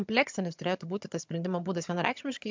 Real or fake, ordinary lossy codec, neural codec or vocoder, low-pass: fake; MP3, 64 kbps; vocoder, 22.05 kHz, 80 mel bands, HiFi-GAN; 7.2 kHz